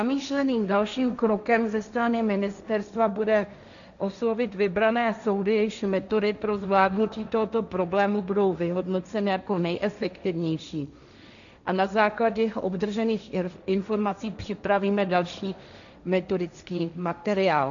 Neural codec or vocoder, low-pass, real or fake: codec, 16 kHz, 1.1 kbps, Voila-Tokenizer; 7.2 kHz; fake